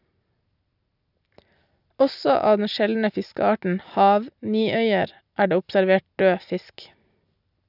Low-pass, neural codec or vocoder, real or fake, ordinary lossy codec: 5.4 kHz; none; real; none